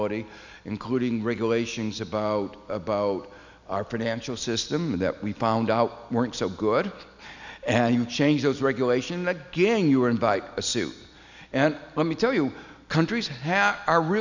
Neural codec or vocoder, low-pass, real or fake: none; 7.2 kHz; real